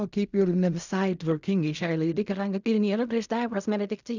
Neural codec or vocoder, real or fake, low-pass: codec, 16 kHz in and 24 kHz out, 0.4 kbps, LongCat-Audio-Codec, fine tuned four codebook decoder; fake; 7.2 kHz